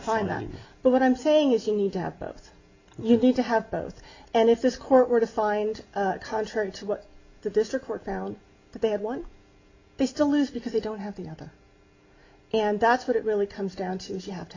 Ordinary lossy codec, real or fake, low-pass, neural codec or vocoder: Opus, 64 kbps; real; 7.2 kHz; none